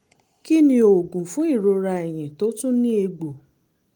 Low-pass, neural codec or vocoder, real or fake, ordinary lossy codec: 19.8 kHz; none; real; Opus, 24 kbps